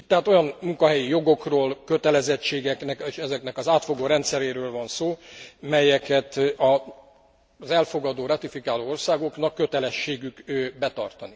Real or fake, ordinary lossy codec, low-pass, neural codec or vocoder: real; none; none; none